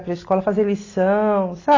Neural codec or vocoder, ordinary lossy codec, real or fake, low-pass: none; AAC, 32 kbps; real; 7.2 kHz